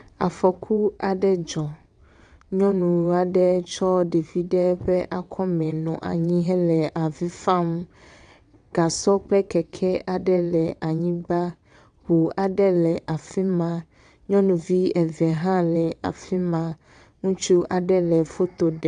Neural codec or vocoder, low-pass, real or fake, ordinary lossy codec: vocoder, 22.05 kHz, 80 mel bands, WaveNeXt; 9.9 kHz; fake; AAC, 96 kbps